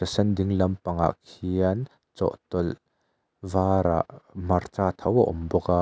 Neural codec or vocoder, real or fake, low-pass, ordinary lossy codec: none; real; none; none